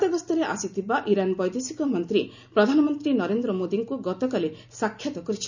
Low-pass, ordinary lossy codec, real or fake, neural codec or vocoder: 7.2 kHz; none; real; none